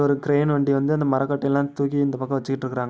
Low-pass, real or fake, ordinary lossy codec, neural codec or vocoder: none; real; none; none